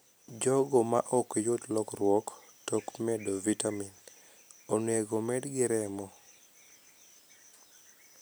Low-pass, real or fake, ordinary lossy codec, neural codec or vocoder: none; real; none; none